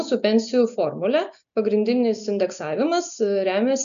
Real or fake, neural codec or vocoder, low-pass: real; none; 7.2 kHz